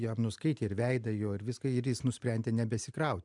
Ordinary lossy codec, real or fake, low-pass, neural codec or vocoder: MP3, 96 kbps; real; 10.8 kHz; none